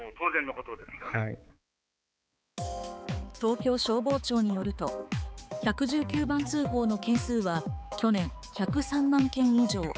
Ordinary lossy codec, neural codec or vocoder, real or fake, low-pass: none; codec, 16 kHz, 4 kbps, X-Codec, HuBERT features, trained on balanced general audio; fake; none